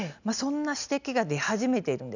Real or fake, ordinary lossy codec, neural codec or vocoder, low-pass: real; none; none; 7.2 kHz